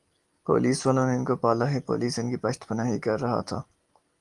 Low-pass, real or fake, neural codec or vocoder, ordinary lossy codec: 10.8 kHz; real; none; Opus, 24 kbps